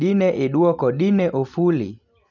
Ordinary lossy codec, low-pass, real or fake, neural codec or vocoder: none; 7.2 kHz; real; none